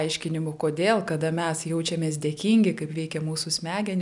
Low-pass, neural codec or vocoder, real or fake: 10.8 kHz; none; real